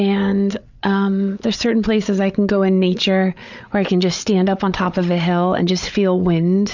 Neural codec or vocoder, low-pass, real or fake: codec, 16 kHz, 8 kbps, FreqCodec, larger model; 7.2 kHz; fake